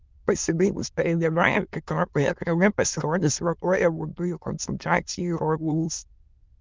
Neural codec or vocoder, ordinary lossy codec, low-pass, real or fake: autoencoder, 22.05 kHz, a latent of 192 numbers a frame, VITS, trained on many speakers; Opus, 32 kbps; 7.2 kHz; fake